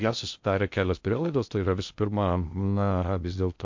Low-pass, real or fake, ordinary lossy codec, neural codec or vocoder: 7.2 kHz; fake; MP3, 48 kbps; codec, 16 kHz in and 24 kHz out, 0.6 kbps, FocalCodec, streaming, 2048 codes